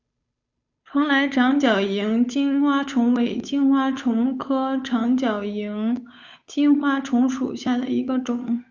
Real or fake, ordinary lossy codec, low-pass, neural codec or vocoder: fake; Opus, 64 kbps; 7.2 kHz; codec, 16 kHz, 8 kbps, FunCodec, trained on Chinese and English, 25 frames a second